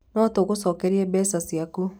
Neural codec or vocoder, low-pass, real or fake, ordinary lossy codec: none; none; real; none